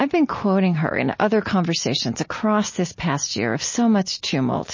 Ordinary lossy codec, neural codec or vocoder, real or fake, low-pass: MP3, 32 kbps; none; real; 7.2 kHz